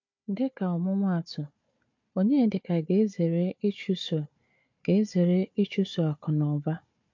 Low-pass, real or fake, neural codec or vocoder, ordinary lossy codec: 7.2 kHz; fake; codec, 16 kHz, 4 kbps, FunCodec, trained on Chinese and English, 50 frames a second; MP3, 48 kbps